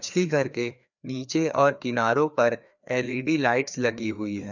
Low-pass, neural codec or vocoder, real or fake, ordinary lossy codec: 7.2 kHz; codec, 16 kHz, 2 kbps, FreqCodec, larger model; fake; none